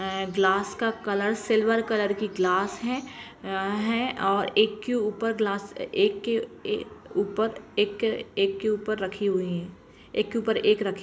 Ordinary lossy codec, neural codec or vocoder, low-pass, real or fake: none; none; none; real